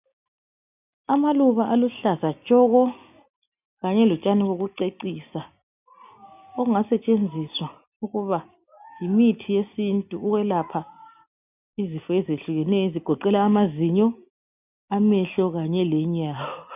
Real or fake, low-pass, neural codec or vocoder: real; 3.6 kHz; none